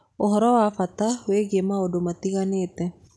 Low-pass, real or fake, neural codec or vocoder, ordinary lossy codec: none; real; none; none